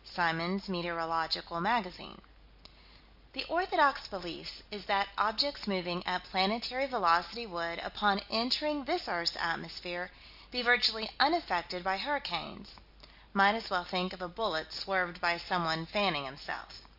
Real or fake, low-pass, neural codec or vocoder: real; 5.4 kHz; none